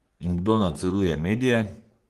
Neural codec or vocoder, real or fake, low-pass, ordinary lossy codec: codec, 44.1 kHz, 3.4 kbps, Pupu-Codec; fake; 14.4 kHz; Opus, 24 kbps